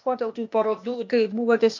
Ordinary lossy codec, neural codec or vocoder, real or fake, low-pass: MP3, 48 kbps; codec, 16 kHz, 0.8 kbps, ZipCodec; fake; 7.2 kHz